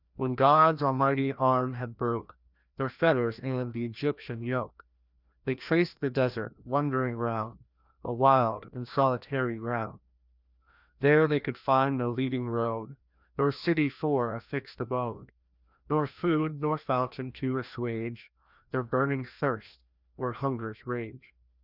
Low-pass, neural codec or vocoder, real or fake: 5.4 kHz; codec, 16 kHz, 1 kbps, FreqCodec, larger model; fake